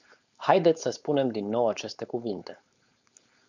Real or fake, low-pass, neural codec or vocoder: fake; 7.2 kHz; codec, 16 kHz, 4.8 kbps, FACodec